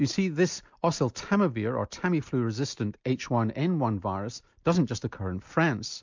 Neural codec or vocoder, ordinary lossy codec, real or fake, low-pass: none; MP3, 64 kbps; real; 7.2 kHz